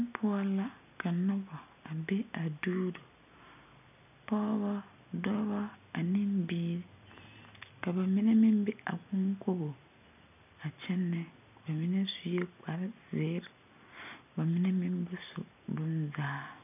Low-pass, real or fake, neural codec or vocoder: 3.6 kHz; real; none